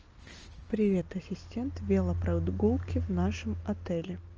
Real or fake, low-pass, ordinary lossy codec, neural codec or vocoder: real; 7.2 kHz; Opus, 24 kbps; none